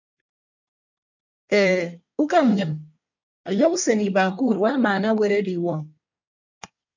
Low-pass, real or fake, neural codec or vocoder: 7.2 kHz; fake; codec, 24 kHz, 1 kbps, SNAC